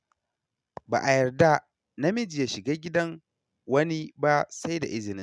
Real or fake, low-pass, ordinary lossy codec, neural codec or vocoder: real; none; none; none